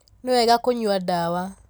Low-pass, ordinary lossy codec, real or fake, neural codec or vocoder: none; none; real; none